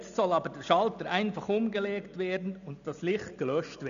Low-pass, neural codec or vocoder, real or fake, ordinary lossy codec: 7.2 kHz; none; real; none